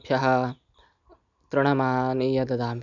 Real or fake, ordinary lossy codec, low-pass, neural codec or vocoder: real; none; 7.2 kHz; none